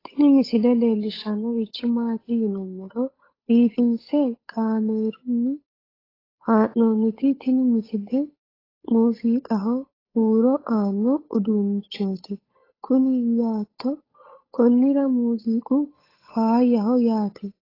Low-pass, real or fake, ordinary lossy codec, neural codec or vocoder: 5.4 kHz; fake; AAC, 24 kbps; codec, 16 kHz, 8 kbps, FunCodec, trained on Chinese and English, 25 frames a second